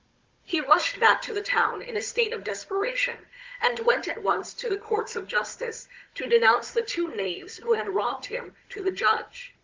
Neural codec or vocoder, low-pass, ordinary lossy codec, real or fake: codec, 16 kHz, 16 kbps, FunCodec, trained on Chinese and English, 50 frames a second; 7.2 kHz; Opus, 32 kbps; fake